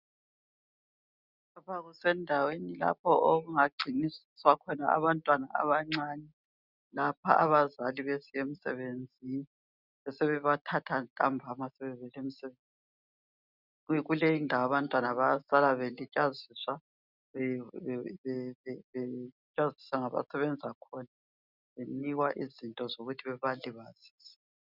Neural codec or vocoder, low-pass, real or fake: none; 5.4 kHz; real